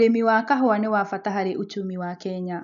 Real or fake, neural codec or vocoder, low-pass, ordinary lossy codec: real; none; 7.2 kHz; none